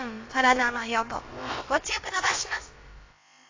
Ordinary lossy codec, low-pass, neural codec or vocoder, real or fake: AAC, 32 kbps; 7.2 kHz; codec, 16 kHz, about 1 kbps, DyCAST, with the encoder's durations; fake